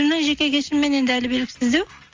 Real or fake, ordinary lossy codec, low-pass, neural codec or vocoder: real; Opus, 32 kbps; 7.2 kHz; none